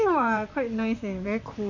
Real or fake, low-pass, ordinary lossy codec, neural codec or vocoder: fake; 7.2 kHz; none; vocoder, 44.1 kHz, 128 mel bands, Pupu-Vocoder